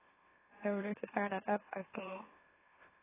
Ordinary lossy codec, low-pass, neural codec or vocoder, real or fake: AAC, 16 kbps; 3.6 kHz; autoencoder, 44.1 kHz, a latent of 192 numbers a frame, MeloTTS; fake